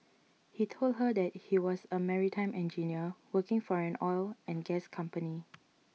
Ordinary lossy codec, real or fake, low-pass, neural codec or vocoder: none; real; none; none